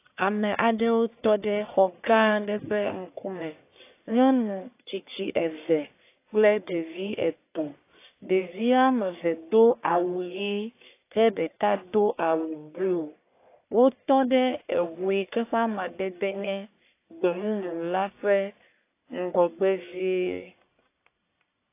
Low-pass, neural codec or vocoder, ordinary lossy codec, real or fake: 3.6 kHz; codec, 44.1 kHz, 1.7 kbps, Pupu-Codec; AAC, 24 kbps; fake